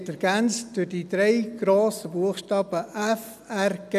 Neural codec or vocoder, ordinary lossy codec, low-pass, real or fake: none; none; 14.4 kHz; real